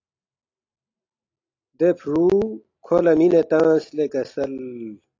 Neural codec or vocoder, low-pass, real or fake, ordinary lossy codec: none; 7.2 kHz; real; AAC, 48 kbps